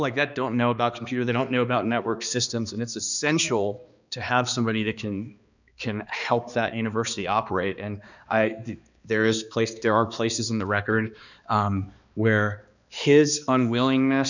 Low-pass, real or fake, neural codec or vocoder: 7.2 kHz; fake; codec, 16 kHz, 2 kbps, X-Codec, HuBERT features, trained on balanced general audio